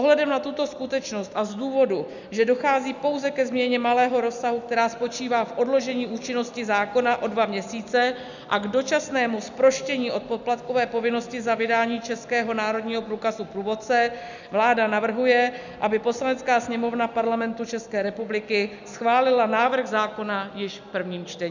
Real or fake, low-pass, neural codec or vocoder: real; 7.2 kHz; none